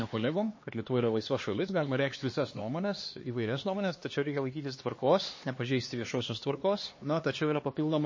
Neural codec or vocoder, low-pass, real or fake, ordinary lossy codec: codec, 16 kHz, 2 kbps, X-Codec, HuBERT features, trained on LibriSpeech; 7.2 kHz; fake; MP3, 32 kbps